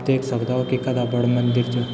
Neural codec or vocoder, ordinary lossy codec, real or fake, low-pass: none; none; real; none